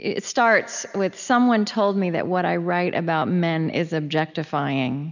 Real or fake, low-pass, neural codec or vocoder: real; 7.2 kHz; none